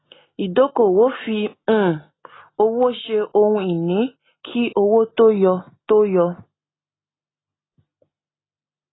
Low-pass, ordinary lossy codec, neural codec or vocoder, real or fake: 7.2 kHz; AAC, 16 kbps; none; real